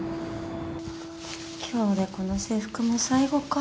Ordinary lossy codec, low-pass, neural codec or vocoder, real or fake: none; none; none; real